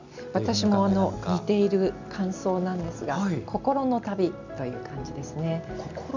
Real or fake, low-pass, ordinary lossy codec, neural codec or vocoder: real; 7.2 kHz; none; none